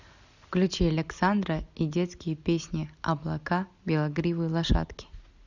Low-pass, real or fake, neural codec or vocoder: 7.2 kHz; real; none